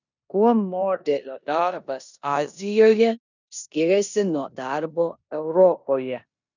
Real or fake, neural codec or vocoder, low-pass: fake; codec, 16 kHz in and 24 kHz out, 0.9 kbps, LongCat-Audio-Codec, four codebook decoder; 7.2 kHz